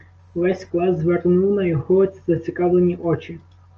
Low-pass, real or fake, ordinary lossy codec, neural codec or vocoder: 7.2 kHz; real; Opus, 24 kbps; none